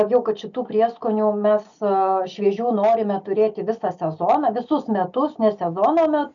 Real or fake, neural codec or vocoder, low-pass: real; none; 7.2 kHz